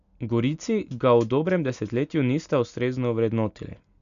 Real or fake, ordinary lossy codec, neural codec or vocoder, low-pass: real; none; none; 7.2 kHz